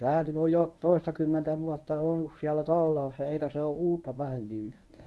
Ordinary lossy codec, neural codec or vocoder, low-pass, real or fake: none; codec, 24 kHz, 0.9 kbps, WavTokenizer, medium speech release version 1; none; fake